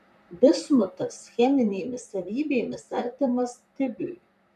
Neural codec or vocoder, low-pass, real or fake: vocoder, 44.1 kHz, 128 mel bands, Pupu-Vocoder; 14.4 kHz; fake